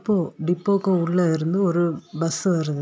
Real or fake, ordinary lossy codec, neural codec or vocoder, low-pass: real; none; none; none